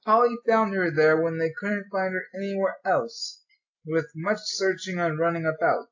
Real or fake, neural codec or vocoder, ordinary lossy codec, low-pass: real; none; AAC, 48 kbps; 7.2 kHz